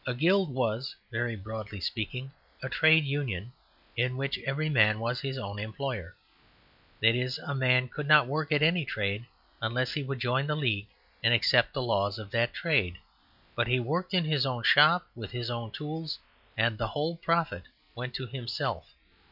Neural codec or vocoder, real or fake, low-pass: none; real; 5.4 kHz